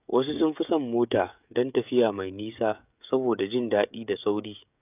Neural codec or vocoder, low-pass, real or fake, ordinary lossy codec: codec, 16 kHz, 16 kbps, FreqCodec, smaller model; 3.6 kHz; fake; none